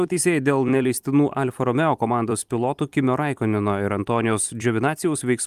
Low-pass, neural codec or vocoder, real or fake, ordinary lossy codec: 14.4 kHz; vocoder, 44.1 kHz, 128 mel bands every 256 samples, BigVGAN v2; fake; Opus, 32 kbps